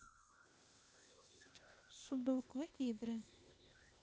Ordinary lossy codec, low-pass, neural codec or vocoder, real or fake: none; none; codec, 16 kHz, 0.8 kbps, ZipCodec; fake